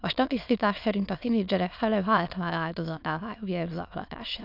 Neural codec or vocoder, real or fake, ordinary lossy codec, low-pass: autoencoder, 22.05 kHz, a latent of 192 numbers a frame, VITS, trained on many speakers; fake; none; 5.4 kHz